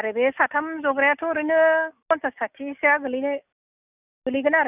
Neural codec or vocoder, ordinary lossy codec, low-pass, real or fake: none; none; 3.6 kHz; real